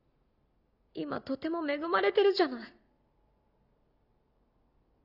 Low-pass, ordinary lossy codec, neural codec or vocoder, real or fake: 5.4 kHz; none; none; real